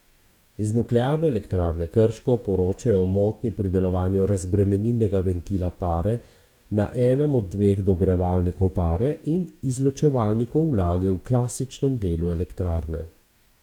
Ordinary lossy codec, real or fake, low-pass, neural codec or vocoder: none; fake; 19.8 kHz; codec, 44.1 kHz, 2.6 kbps, DAC